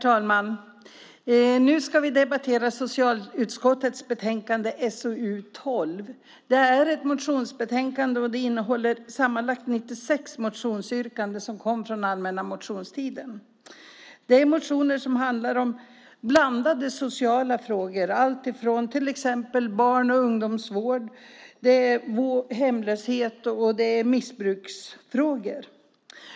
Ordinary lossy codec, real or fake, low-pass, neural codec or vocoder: none; real; none; none